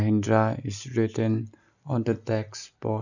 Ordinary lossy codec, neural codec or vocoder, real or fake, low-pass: none; none; real; 7.2 kHz